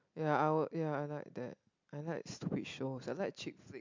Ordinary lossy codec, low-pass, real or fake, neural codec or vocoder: MP3, 64 kbps; 7.2 kHz; real; none